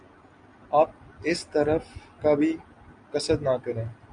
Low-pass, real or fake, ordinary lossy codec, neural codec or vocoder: 9.9 kHz; real; Opus, 64 kbps; none